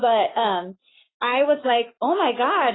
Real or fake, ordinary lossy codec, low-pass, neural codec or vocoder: fake; AAC, 16 kbps; 7.2 kHz; codec, 44.1 kHz, 7.8 kbps, Pupu-Codec